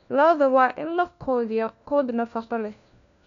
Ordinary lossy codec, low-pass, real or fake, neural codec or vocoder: none; 7.2 kHz; fake; codec, 16 kHz, 1 kbps, FunCodec, trained on LibriTTS, 50 frames a second